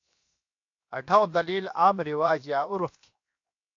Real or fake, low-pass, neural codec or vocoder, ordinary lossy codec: fake; 7.2 kHz; codec, 16 kHz, 0.7 kbps, FocalCodec; AAC, 48 kbps